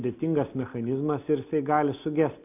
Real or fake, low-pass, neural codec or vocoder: real; 3.6 kHz; none